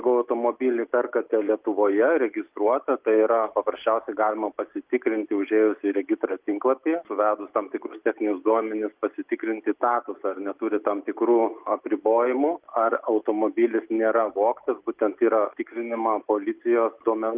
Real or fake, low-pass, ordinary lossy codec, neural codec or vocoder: real; 3.6 kHz; Opus, 32 kbps; none